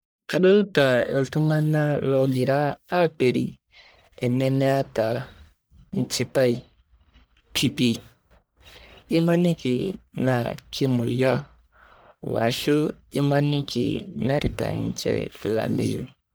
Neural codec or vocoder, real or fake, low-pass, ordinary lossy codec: codec, 44.1 kHz, 1.7 kbps, Pupu-Codec; fake; none; none